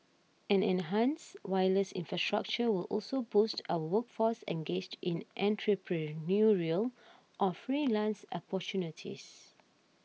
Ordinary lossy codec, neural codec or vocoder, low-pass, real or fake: none; none; none; real